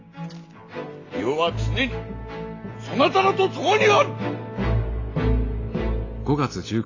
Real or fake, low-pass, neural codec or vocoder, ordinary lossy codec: real; 7.2 kHz; none; AAC, 32 kbps